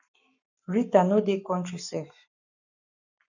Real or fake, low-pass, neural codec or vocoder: fake; 7.2 kHz; codec, 44.1 kHz, 7.8 kbps, Pupu-Codec